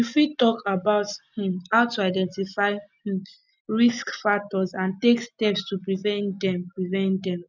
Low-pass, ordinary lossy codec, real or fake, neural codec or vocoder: 7.2 kHz; none; real; none